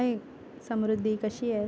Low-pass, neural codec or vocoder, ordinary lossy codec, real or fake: none; none; none; real